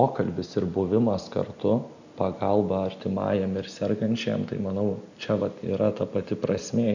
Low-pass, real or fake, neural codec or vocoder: 7.2 kHz; real; none